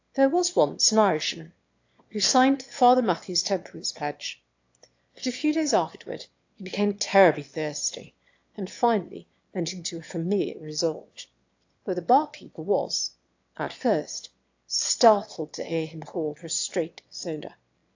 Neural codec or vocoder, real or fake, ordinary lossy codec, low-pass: autoencoder, 22.05 kHz, a latent of 192 numbers a frame, VITS, trained on one speaker; fake; AAC, 48 kbps; 7.2 kHz